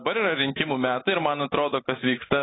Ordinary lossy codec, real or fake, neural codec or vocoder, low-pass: AAC, 16 kbps; real; none; 7.2 kHz